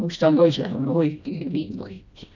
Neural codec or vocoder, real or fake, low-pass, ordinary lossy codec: codec, 16 kHz, 1 kbps, FreqCodec, smaller model; fake; 7.2 kHz; none